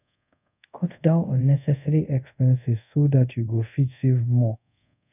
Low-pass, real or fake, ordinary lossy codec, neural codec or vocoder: 3.6 kHz; fake; none; codec, 24 kHz, 0.5 kbps, DualCodec